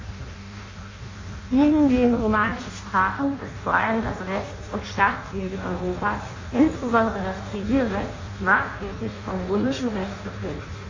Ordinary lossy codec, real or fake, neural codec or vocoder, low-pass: MP3, 32 kbps; fake; codec, 16 kHz in and 24 kHz out, 0.6 kbps, FireRedTTS-2 codec; 7.2 kHz